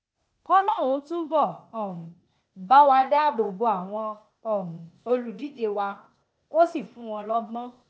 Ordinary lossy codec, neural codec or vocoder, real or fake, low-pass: none; codec, 16 kHz, 0.8 kbps, ZipCodec; fake; none